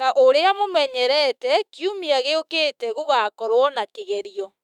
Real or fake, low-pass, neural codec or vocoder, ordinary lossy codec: fake; 19.8 kHz; autoencoder, 48 kHz, 32 numbers a frame, DAC-VAE, trained on Japanese speech; none